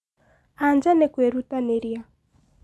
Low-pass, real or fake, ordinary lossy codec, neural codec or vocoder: none; real; none; none